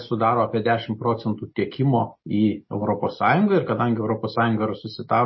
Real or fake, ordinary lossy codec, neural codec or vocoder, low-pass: real; MP3, 24 kbps; none; 7.2 kHz